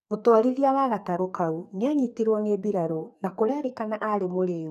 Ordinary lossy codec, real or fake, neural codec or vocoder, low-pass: none; fake; codec, 44.1 kHz, 2.6 kbps, SNAC; 14.4 kHz